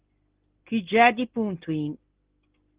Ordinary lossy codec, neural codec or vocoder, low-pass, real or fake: Opus, 16 kbps; none; 3.6 kHz; real